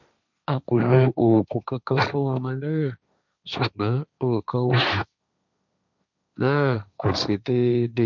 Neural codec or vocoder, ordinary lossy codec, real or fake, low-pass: codec, 16 kHz, 1.1 kbps, Voila-Tokenizer; none; fake; 7.2 kHz